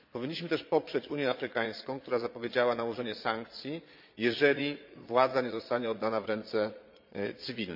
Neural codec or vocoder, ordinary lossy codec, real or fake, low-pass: vocoder, 22.05 kHz, 80 mel bands, Vocos; MP3, 48 kbps; fake; 5.4 kHz